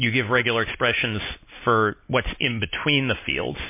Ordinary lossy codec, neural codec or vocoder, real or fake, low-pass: MP3, 24 kbps; none; real; 3.6 kHz